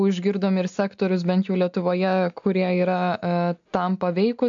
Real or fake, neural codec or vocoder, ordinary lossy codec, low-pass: real; none; AAC, 64 kbps; 7.2 kHz